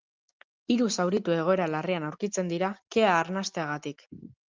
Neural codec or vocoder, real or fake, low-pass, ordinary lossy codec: none; real; 7.2 kHz; Opus, 32 kbps